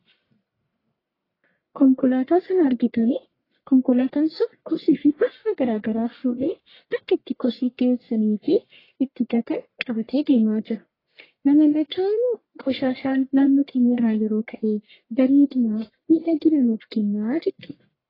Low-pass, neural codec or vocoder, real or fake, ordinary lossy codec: 5.4 kHz; codec, 44.1 kHz, 1.7 kbps, Pupu-Codec; fake; AAC, 24 kbps